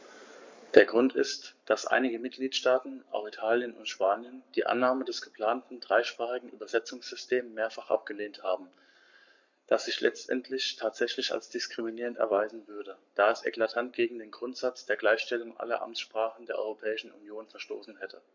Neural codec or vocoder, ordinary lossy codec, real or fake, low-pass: codec, 44.1 kHz, 7.8 kbps, Pupu-Codec; MP3, 64 kbps; fake; 7.2 kHz